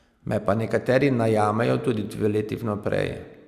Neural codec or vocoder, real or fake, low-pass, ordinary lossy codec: none; real; 14.4 kHz; Opus, 64 kbps